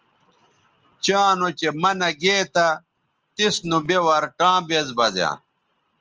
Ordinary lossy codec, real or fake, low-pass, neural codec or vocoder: Opus, 24 kbps; real; 7.2 kHz; none